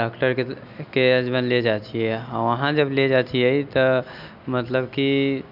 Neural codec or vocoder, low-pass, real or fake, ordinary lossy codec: none; 5.4 kHz; real; none